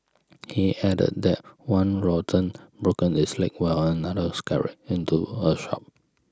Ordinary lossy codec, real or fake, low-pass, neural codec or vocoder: none; real; none; none